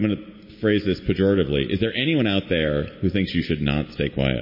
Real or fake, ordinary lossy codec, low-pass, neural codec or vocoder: real; MP3, 24 kbps; 5.4 kHz; none